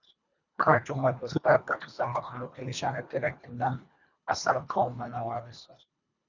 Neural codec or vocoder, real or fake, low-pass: codec, 24 kHz, 1.5 kbps, HILCodec; fake; 7.2 kHz